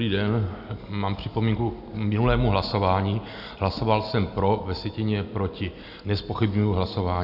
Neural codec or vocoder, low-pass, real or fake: none; 5.4 kHz; real